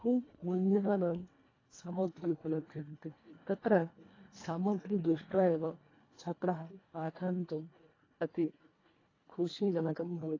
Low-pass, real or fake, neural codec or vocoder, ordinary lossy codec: 7.2 kHz; fake; codec, 24 kHz, 1.5 kbps, HILCodec; AAC, 32 kbps